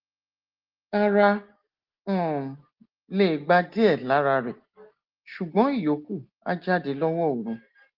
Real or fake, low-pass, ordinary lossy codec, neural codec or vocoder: real; 5.4 kHz; Opus, 16 kbps; none